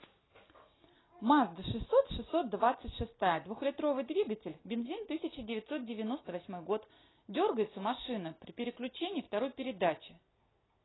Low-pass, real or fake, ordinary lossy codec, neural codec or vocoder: 7.2 kHz; real; AAC, 16 kbps; none